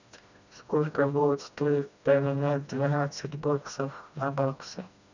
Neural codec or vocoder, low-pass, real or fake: codec, 16 kHz, 1 kbps, FreqCodec, smaller model; 7.2 kHz; fake